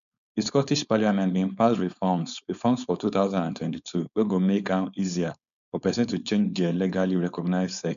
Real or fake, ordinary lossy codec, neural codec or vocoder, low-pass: fake; none; codec, 16 kHz, 4.8 kbps, FACodec; 7.2 kHz